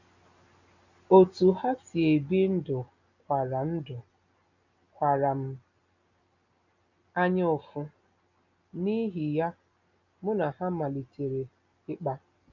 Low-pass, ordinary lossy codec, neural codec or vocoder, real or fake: 7.2 kHz; none; none; real